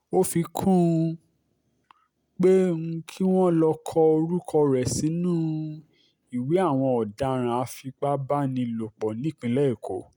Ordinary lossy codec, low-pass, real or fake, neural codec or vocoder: none; 19.8 kHz; real; none